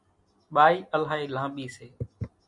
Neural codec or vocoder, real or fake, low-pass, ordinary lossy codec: none; real; 10.8 kHz; MP3, 64 kbps